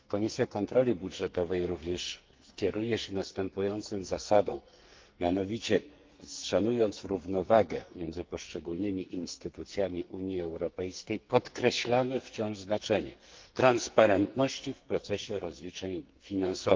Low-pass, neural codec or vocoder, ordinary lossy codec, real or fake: 7.2 kHz; codec, 44.1 kHz, 2.6 kbps, SNAC; Opus, 32 kbps; fake